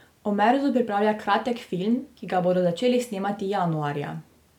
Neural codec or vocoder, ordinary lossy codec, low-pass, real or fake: none; none; 19.8 kHz; real